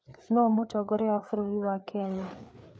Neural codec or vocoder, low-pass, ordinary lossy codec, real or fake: codec, 16 kHz, 4 kbps, FreqCodec, larger model; none; none; fake